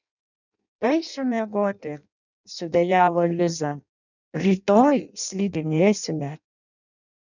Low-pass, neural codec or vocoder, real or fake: 7.2 kHz; codec, 16 kHz in and 24 kHz out, 0.6 kbps, FireRedTTS-2 codec; fake